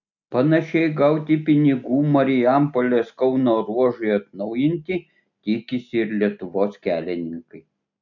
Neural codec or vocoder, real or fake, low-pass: none; real; 7.2 kHz